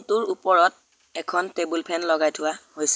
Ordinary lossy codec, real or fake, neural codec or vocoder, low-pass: none; real; none; none